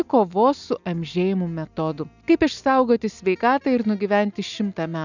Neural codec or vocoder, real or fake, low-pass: none; real; 7.2 kHz